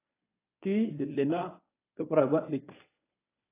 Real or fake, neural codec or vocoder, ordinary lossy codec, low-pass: fake; codec, 24 kHz, 0.9 kbps, WavTokenizer, medium speech release version 1; AAC, 16 kbps; 3.6 kHz